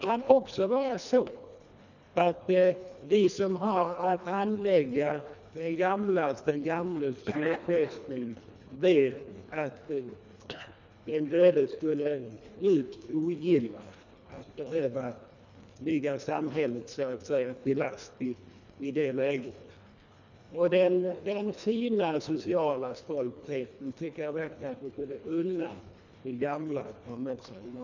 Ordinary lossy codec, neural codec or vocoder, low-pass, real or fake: none; codec, 24 kHz, 1.5 kbps, HILCodec; 7.2 kHz; fake